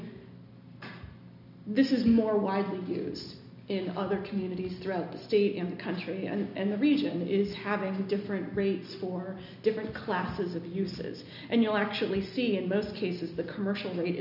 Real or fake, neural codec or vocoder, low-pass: real; none; 5.4 kHz